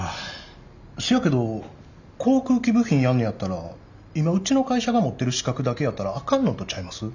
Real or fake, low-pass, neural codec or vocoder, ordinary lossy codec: real; 7.2 kHz; none; none